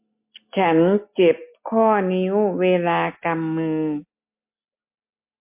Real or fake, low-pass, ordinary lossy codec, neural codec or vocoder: real; 3.6 kHz; MP3, 24 kbps; none